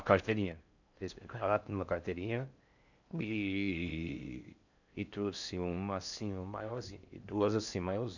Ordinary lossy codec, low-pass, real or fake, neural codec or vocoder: none; 7.2 kHz; fake; codec, 16 kHz in and 24 kHz out, 0.6 kbps, FocalCodec, streaming, 4096 codes